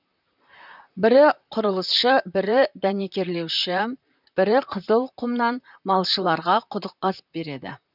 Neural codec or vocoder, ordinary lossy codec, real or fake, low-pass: vocoder, 44.1 kHz, 128 mel bands, Pupu-Vocoder; none; fake; 5.4 kHz